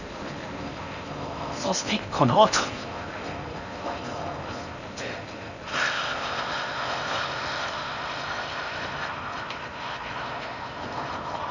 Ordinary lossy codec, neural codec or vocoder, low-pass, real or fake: none; codec, 16 kHz in and 24 kHz out, 0.6 kbps, FocalCodec, streaming, 4096 codes; 7.2 kHz; fake